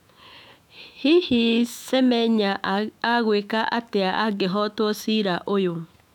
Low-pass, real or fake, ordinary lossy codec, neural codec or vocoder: 19.8 kHz; fake; none; autoencoder, 48 kHz, 128 numbers a frame, DAC-VAE, trained on Japanese speech